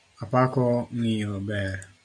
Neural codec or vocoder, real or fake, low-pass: none; real; 9.9 kHz